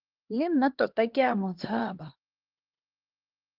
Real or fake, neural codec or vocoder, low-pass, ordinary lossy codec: fake; codec, 16 kHz, 2 kbps, X-Codec, HuBERT features, trained on LibriSpeech; 5.4 kHz; Opus, 16 kbps